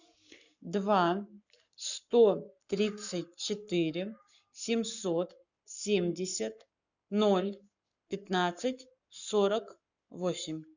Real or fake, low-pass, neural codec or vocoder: fake; 7.2 kHz; codec, 44.1 kHz, 7.8 kbps, Pupu-Codec